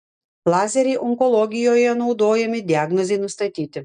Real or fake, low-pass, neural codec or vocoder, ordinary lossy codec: real; 9.9 kHz; none; MP3, 96 kbps